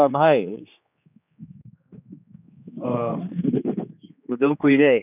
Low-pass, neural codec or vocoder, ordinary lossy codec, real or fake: 3.6 kHz; codec, 16 kHz, 2 kbps, X-Codec, HuBERT features, trained on general audio; none; fake